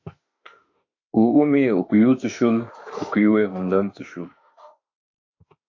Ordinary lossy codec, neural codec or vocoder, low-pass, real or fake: AAC, 48 kbps; autoencoder, 48 kHz, 32 numbers a frame, DAC-VAE, trained on Japanese speech; 7.2 kHz; fake